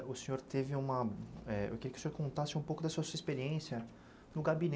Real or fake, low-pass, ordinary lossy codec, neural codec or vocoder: real; none; none; none